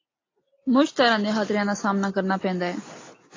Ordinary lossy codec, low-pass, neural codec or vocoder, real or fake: AAC, 32 kbps; 7.2 kHz; none; real